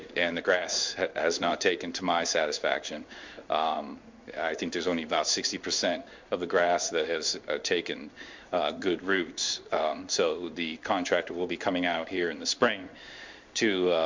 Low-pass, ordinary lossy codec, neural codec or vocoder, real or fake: 7.2 kHz; MP3, 64 kbps; codec, 16 kHz in and 24 kHz out, 1 kbps, XY-Tokenizer; fake